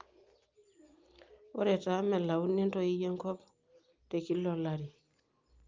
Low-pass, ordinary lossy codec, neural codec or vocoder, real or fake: 7.2 kHz; Opus, 24 kbps; none; real